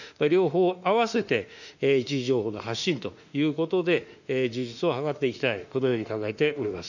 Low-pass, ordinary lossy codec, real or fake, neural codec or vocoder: 7.2 kHz; none; fake; autoencoder, 48 kHz, 32 numbers a frame, DAC-VAE, trained on Japanese speech